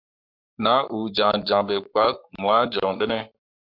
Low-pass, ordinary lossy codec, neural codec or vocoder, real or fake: 5.4 kHz; MP3, 48 kbps; codec, 44.1 kHz, 7.8 kbps, Pupu-Codec; fake